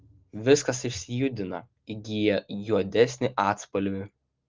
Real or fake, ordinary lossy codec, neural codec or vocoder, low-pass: real; Opus, 32 kbps; none; 7.2 kHz